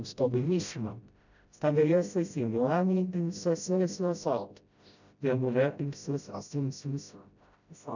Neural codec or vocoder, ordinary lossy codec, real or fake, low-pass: codec, 16 kHz, 0.5 kbps, FreqCodec, smaller model; none; fake; 7.2 kHz